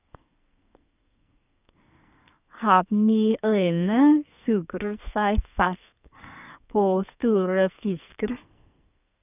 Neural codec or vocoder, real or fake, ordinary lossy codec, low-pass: codec, 44.1 kHz, 2.6 kbps, SNAC; fake; none; 3.6 kHz